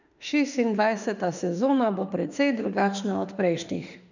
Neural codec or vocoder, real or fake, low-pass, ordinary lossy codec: autoencoder, 48 kHz, 32 numbers a frame, DAC-VAE, trained on Japanese speech; fake; 7.2 kHz; none